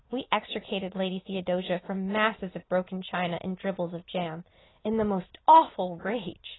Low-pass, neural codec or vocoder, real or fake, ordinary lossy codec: 7.2 kHz; none; real; AAC, 16 kbps